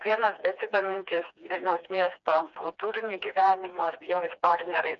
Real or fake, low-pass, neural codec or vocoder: fake; 7.2 kHz; codec, 16 kHz, 2 kbps, FreqCodec, smaller model